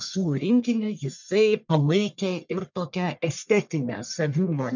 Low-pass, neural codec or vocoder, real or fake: 7.2 kHz; codec, 44.1 kHz, 1.7 kbps, Pupu-Codec; fake